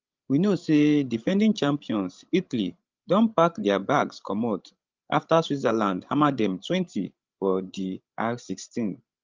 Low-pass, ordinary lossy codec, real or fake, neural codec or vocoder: 7.2 kHz; Opus, 32 kbps; fake; codec, 16 kHz, 16 kbps, FreqCodec, larger model